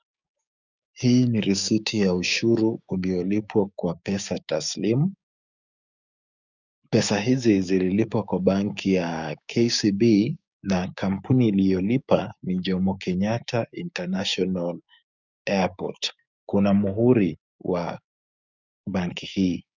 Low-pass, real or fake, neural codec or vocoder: 7.2 kHz; fake; codec, 16 kHz, 6 kbps, DAC